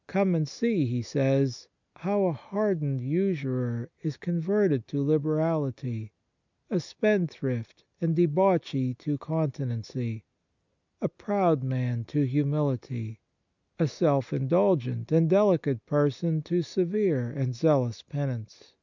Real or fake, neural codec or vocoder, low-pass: real; none; 7.2 kHz